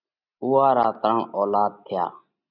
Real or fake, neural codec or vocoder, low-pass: real; none; 5.4 kHz